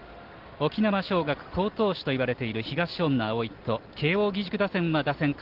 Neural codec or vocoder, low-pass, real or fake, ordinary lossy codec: none; 5.4 kHz; real; Opus, 16 kbps